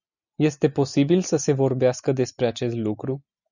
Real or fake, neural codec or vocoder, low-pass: real; none; 7.2 kHz